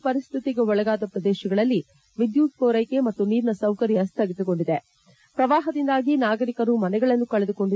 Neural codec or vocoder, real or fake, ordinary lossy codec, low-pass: none; real; none; none